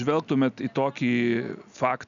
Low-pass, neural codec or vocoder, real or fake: 7.2 kHz; none; real